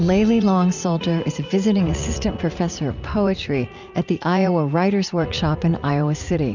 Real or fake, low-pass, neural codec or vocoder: fake; 7.2 kHz; vocoder, 44.1 kHz, 80 mel bands, Vocos